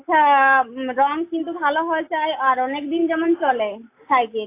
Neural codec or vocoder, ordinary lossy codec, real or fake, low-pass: none; AAC, 24 kbps; real; 3.6 kHz